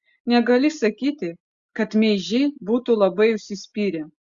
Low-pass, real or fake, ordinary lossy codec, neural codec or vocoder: 7.2 kHz; real; Opus, 64 kbps; none